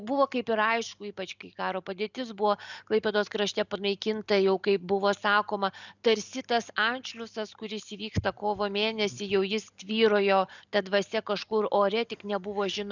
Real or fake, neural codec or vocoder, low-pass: real; none; 7.2 kHz